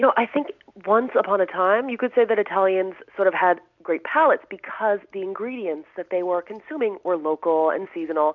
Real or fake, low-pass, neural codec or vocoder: real; 7.2 kHz; none